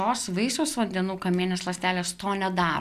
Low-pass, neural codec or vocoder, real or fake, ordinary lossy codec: 14.4 kHz; none; real; MP3, 96 kbps